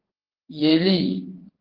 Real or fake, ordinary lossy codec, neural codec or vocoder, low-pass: fake; Opus, 16 kbps; vocoder, 22.05 kHz, 80 mel bands, WaveNeXt; 5.4 kHz